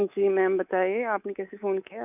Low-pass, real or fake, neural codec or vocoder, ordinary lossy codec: 3.6 kHz; fake; codec, 24 kHz, 3.1 kbps, DualCodec; none